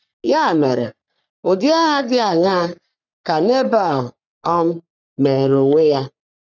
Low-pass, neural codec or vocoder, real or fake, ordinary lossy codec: 7.2 kHz; codec, 44.1 kHz, 3.4 kbps, Pupu-Codec; fake; none